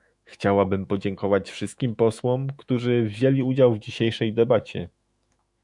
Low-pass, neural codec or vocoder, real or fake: 10.8 kHz; autoencoder, 48 kHz, 128 numbers a frame, DAC-VAE, trained on Japanese speech; fake